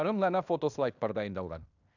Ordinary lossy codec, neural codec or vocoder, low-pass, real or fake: none; codec, 16 kHz in and 24 kHz out, 0.9 kbps, LongCat-Audio-Codec, fine tuned four codebook decoder; 7.2 kHz; fake